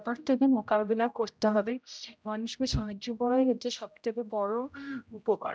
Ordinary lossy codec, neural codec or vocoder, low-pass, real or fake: none; codec, 16 kHz, 0.5 kbps, X-Codec, HuBERT features, trained on general audio; none; fake